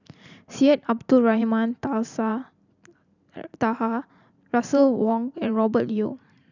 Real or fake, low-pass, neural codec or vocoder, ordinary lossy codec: fake; 7.2 kHz; vocoder, 44.1 kHz, 128 mel bands every 512 samples, BigVGAN v2; none